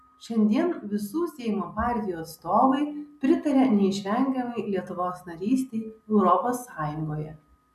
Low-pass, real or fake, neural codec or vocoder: 14.4 kHz; real; none